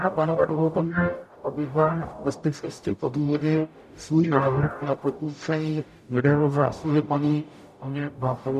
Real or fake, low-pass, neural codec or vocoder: fake; 14.4 kHz; codec, 44.1 kHz, 0.9 kbps, DAC